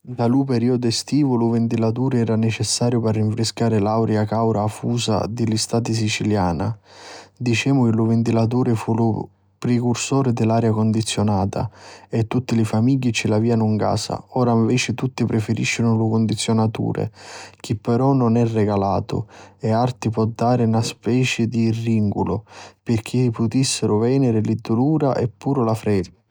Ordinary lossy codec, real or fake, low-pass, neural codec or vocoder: none; real; none; none